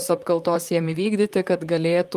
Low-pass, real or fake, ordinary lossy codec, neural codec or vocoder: 14.4 kHz; fake; Opus, 32 kbps; vocoder, 44.1 kHz, 128 mel bands, Pupu-Vocoder